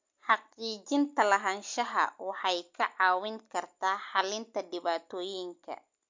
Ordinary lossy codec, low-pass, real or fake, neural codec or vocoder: MP3, 48 kbps; 7.2 kHz; real; none